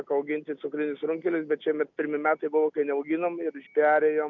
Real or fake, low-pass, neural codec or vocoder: real; 7.2 kHz; none